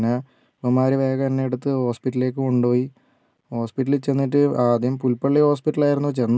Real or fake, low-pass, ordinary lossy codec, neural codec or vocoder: real; none; none; none